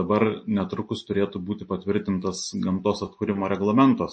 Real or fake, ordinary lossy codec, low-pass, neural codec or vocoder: real; MP3, 32 kbps; 9.9 kHz; none